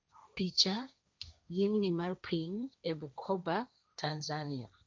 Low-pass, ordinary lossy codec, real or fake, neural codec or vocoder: none; none; fake; codec, 16 kHz, 1.1 kbps, Voila-Tokenizer